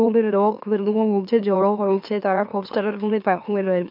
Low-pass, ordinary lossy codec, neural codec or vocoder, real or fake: 5.4 kHz; none; autoencoder, 44.1 kHz, a latent of 192 numbers a frame, MeloTTS; fake